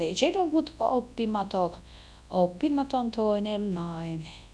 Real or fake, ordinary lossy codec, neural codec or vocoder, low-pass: fake; none; codec, 24 kHz, 0.9 kbps, WavTokenizer, large speech release; none